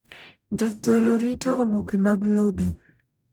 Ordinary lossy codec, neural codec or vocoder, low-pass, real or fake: none; codec, 44.1 kHz, 0.9 kbps, DAC; none; fake